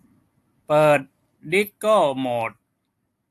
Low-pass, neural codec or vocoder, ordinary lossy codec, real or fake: 14.4 kHz; none; AAC, 64 kbps; real